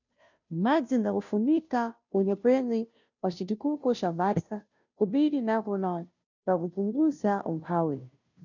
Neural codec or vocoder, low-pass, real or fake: codec, 16 kHz, 0.5 kbps, FunCodec, trained on Chinese and English, 25 frames a second; 7.2 kHz; fake